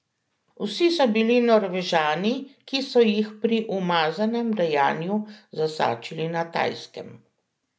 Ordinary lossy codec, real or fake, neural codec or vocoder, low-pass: none; real; none; none